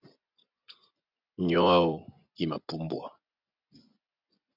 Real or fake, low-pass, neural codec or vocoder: fake; 5.4 kHz; vocoder, 24 kHz, 100 mel bands, Vocos